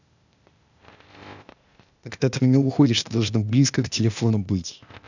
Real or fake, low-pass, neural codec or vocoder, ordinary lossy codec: fake; 7.2 kHz; codec, 16 kHz, 0.8 kbps, ZipCodec; none